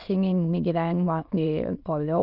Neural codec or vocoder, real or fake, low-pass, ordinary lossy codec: autoencoder, 22.05 kHz, a latent of 192 numbers a frame, VITS, trained on many speakers; fake; 5.4 kHz; Opus, 32 kbps